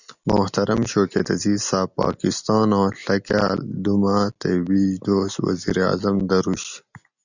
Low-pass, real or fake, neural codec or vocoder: 7.2 kHz; real; none